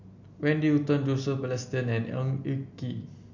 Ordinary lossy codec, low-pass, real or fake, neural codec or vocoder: MP3, 48 kbps; 7.2 kHz; real; none